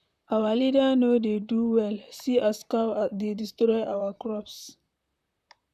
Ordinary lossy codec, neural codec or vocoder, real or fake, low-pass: none; vocoder, 44.1 kHz, 128 mel bands, Pupu-Vocoder; fake; 14.4 kHz